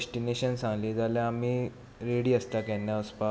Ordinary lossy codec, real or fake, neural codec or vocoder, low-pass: none; real; none; none